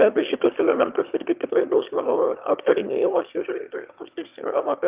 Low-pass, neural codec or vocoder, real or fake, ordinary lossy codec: 3.6 kHz; autoencoder, 22.05 kHz, a latent of 192 numbers a frame, VITS, trained on one speaker; fake; Opus, 24 kbps